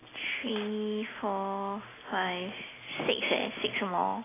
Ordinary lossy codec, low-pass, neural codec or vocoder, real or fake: AAC, 16 kbps; 3.6 kHz; none; real